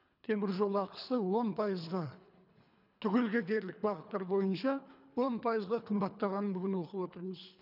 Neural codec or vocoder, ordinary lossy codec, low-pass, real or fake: codec, 24 kHz, 3 kbps, HILCodec; none; 5.4 kHz; fake